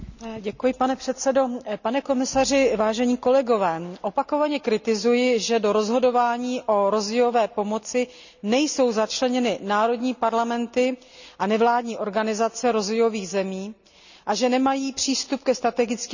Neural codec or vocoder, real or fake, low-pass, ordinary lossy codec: none; real; 7.2 kHz; none